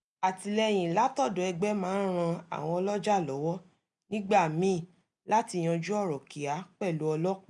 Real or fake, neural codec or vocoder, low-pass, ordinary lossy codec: real; none; 10.8 kHz; none